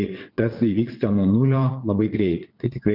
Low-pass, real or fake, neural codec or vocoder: 5.4 kHz; fake; vocoder, 22.05 kHz, 80 mel bands, WaveNeXt